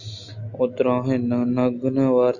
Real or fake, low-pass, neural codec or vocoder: real; 7.2 kHz; none